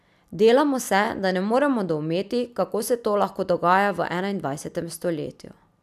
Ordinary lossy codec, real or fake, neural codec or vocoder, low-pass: none; real; none; 14.4 kHz